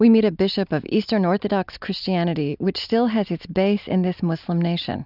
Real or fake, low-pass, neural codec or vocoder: real; 5.4 kHz; none